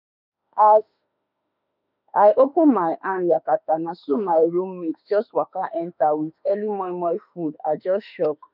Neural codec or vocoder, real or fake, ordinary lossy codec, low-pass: autoencoder, 48 kHz, 32 numbers a frame, DAC-VAE, trained on Japanese speech; fake; none; 5.4 kHz